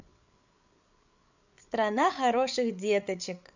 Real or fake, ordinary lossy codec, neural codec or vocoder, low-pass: fake; none; codec, 16 kHz, 8 kbps, FreqCodec, larger model; 7.2 kHz